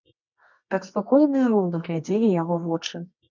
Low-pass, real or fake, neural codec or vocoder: 7.2 kHz; fake; codec, 24 kHz, 0.9 kbps, WavTokenizer, medium music audio release